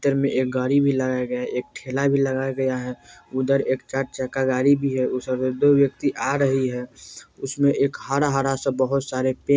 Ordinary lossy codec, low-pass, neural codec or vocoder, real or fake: none; none; none; real